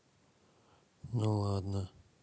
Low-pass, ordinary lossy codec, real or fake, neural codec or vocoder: none; none; real; none